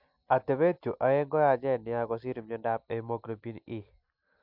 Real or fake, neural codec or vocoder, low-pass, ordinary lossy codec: real; none; 5.4 kHz; MP3, 48 kbps